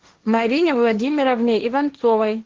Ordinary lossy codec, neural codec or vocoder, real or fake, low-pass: Opus, 16 kbps; codec, 16 kHz, 1.1 kbps, Voila-Tokenizer; fake; 7.2 kHz